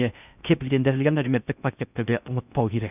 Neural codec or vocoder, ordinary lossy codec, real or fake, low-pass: codec, 16 kHz in and 24 kHz out, 0.6 kbps, FocalCodec, streaming, 4096 codes; none; fake; 3.6 kHz